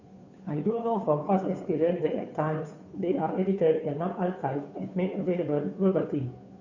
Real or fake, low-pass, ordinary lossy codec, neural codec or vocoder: fake; 7.2 kHz; none; codec, 16 kHz, 2 kbps, FunCodec, trained on Chinese and English, 25 frames a second